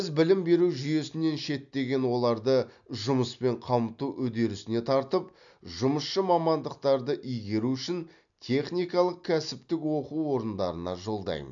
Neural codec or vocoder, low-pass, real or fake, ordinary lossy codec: none; 7.2 kHz; real; none